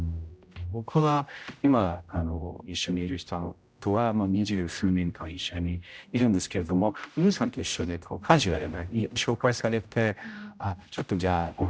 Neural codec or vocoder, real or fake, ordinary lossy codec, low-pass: codec, 16 kHz, 0.5 kbps, X-Codec, HuBERT features, trained on general audio; fake; none; none